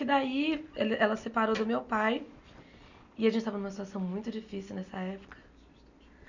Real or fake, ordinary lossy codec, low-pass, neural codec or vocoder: real; none; 7.2 kHz; none